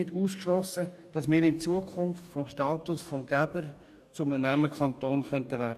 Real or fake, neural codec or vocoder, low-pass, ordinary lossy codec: fake; codec, 44.1 kHz, 2.6 kbps, DAC; 14.4 kHz; none